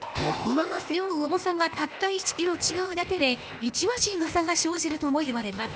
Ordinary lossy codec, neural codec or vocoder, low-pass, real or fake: none; codec, 16 kHz, 0.8 kbps, ZipCodec; none; fake